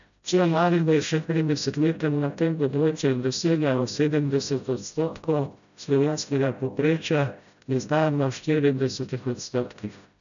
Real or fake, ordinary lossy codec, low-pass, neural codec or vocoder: fake; none; 7.2 kHz; codec, 16 kHz, 0.5 kbps, FreqCodec, smaller model